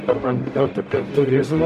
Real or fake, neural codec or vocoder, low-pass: fake; codec, 44.1 kHz, 0.9 kbps, DAC; 14.4 kHz